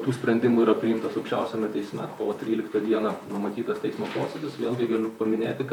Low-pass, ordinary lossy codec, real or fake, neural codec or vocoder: 19.8 kHz; MP3, 96 kbps; fake; vocoder, 44.1 kHz, 128 mel bands, Pupu-Vocoder